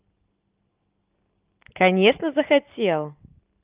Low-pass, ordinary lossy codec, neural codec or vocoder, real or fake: 3.6 kHz; Opus, 24 kbps; none; real